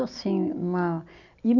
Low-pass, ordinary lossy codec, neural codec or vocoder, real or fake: 7.2 kHz; none; none; real